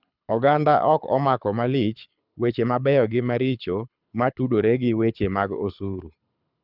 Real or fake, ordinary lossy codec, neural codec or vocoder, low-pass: fake; none; codec, 24 kHz, 6 kbps, HILCodec; 5.4 kHz